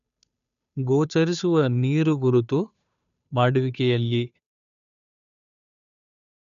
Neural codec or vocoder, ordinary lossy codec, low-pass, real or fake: codec, 16 kHz, 2 kbps, FunCodec, trained on Chinese and English, 25 frames a second; none; 7.2 kHz; fake